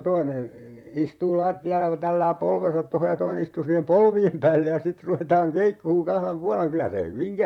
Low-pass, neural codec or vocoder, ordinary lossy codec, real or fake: 19.8 kHz; vocoder, 44.1 kHz, 128 mel bands, Pupu-Vocoder; none; fake